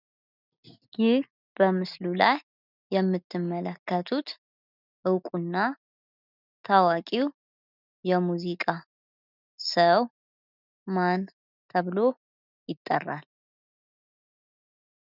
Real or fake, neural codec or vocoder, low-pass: real; none; 5.4 kHz